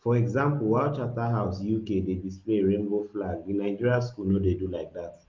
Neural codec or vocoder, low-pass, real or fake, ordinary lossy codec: none; 7.2 kHz; real; Opus, 32 kbps